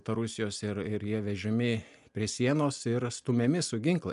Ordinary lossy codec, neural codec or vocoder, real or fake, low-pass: MP3, 96 kbps; none; real; 10.8 kHz